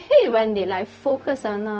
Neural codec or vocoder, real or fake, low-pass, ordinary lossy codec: codec, 16 kHz, 0.4 kbps, LongCat-Audio-Codec; fake; none; none